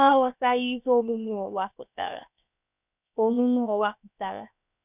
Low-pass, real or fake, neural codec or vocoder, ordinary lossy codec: 3.6 kHz; fake; codec, 16 kHz, 0.7 kbps, FocalCodec; none